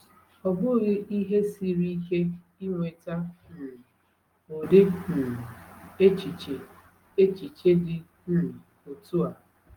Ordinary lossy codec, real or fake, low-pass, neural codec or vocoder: Opus, 32 kbps; real; 19.8 kHz; none